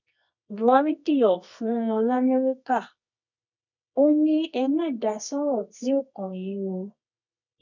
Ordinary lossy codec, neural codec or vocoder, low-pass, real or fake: none; codec, 24 kHz, 0.9 kbps, WavTokenizer, medium music audio release; 7.2 kHz; fake